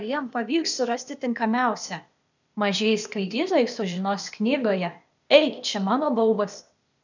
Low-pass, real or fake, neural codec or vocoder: 7.2 kHz; fake; codec, 16 kHz, 0.8 kbps, ZipCodec